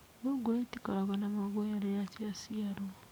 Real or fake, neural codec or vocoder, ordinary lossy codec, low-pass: fake; codec, 44.1 kHz, 7.8 kbps, DAC; none; none